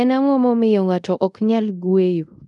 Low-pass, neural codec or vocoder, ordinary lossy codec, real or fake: 10.8 kHz; codec, 24 kHz, 0.5 kbps, DualCodec; none; fake